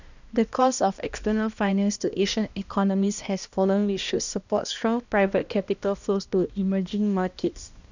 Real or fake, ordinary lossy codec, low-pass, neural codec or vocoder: fake; none; 7.2 kHz; codec, 16 kHz, 1 kbps, X-Codec, HuBERT features, trained on balanced general audio